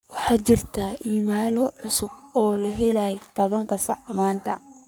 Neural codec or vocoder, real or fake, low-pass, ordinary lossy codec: codec, 44.1 kHz, 3.4 kbps, Pupu-Codec; fake; none; none